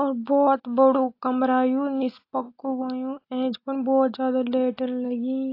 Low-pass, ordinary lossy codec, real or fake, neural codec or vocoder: 5.4 kHz; AAC, 32 kbps; real; none